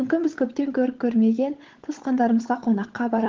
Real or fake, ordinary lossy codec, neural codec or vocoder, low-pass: fake; Opus, 16 kbps; codec, 16 kHz, 16 kbps, FunCodec, trained on LibriTTS, 50 frames a second; 7.2 kHz